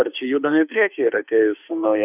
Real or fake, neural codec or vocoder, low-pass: fake; autoencoder, 48 kHz, 32 numbers a frame, DAC-VAE, trained on Japanese speech; 3.6 kHz